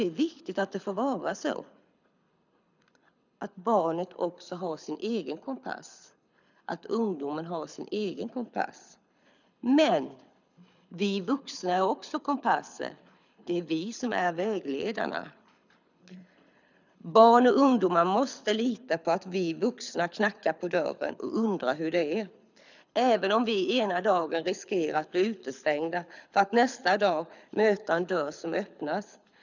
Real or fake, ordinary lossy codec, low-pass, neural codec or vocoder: fake; none; 7.2 kHz; codec, 24 kHz, 6 kbps, HILCodec